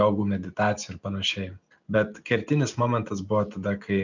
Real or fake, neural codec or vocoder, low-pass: real; none; 7.2 kHz